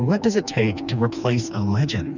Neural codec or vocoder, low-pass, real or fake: codec, 16 kHz, 2 kbps, FreqCodec, smaller model; 7.2 kHz; fake